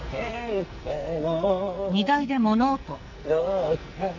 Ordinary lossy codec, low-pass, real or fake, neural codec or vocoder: none; 7.2 kHz; fake; codec, 44.1 kHz, 2.6 kbps, SNAC